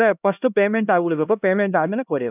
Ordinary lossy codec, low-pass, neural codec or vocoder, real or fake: none; 3.6 kHz; codec, 16 kHz, 1 kbps, X-Codec, HuBERT features, trained on LibriSpeech; fake